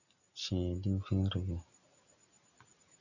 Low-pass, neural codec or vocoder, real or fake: 7.2 kHz; none; real